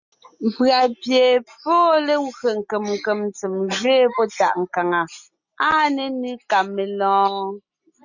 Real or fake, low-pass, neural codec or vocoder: real; 7.2 kHz; none